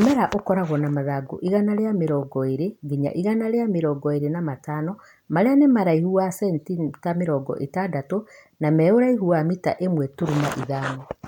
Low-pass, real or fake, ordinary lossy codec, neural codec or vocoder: 19.8 kHz; real; none; none